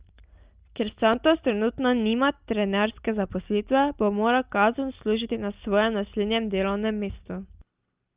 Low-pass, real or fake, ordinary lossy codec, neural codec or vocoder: 3.6 kHz; real; Opus, 24 kbps; none